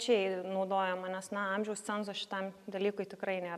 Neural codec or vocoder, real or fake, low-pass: none; real; 14.4 kHz